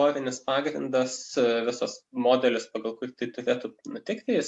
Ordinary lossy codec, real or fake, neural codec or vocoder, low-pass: AAC, 64 kbps; real; none; 10.8 kHz